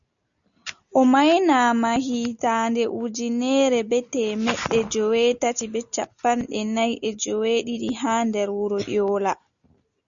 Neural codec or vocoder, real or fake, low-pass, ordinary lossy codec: none; real; 7.2 kHz; MP3, 96 kbps